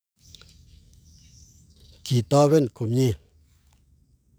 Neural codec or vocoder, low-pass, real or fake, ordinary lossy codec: codec, 44.1 kHz, 7.8 kbps, DAC; none; fake; none